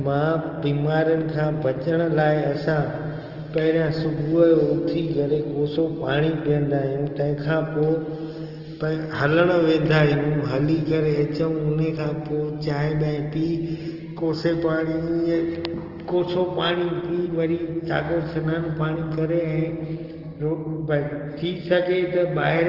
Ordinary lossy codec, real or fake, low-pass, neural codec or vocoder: Opus, 16 kbps; real; 5.4 kHz; none